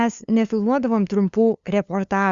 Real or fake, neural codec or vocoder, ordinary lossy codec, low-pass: fake; codec, 16 kHz, 2 kbps, FunCodec, trained on LibriTTS, 25 frames a second; Opus, 64 kbps; 7.2 kHz